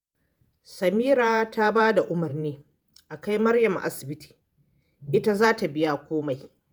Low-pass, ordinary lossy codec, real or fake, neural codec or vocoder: none; none; fake; vocoder, 48 kHz, 128 mel bands, Vocos